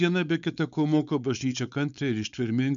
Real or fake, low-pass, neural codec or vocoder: fake; 7.2 kHz; codec, 16 kHz, 4.8 kbps, FACodec